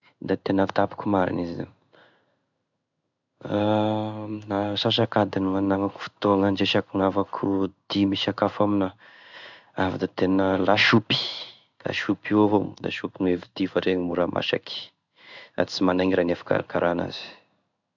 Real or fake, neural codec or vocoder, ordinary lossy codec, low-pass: fake; codec, 16 kHz in and 24 kHz out, 1 kbps, XY-Tokenizer; none; 7.2 kHz